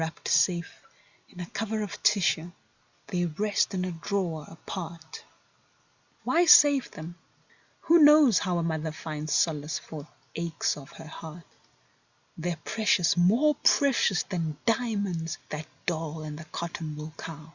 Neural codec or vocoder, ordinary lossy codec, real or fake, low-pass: none; Opus, 64 kbps; real; 7.2 kHz